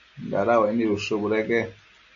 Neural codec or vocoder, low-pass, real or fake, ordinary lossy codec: none; 7.2 kHz; real; AAC, 64 kbps